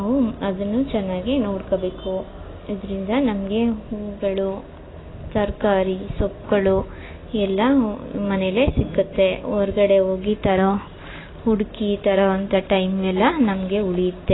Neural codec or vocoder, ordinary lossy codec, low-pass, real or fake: none; AAC, 16 kbps; 7.2 kHz; real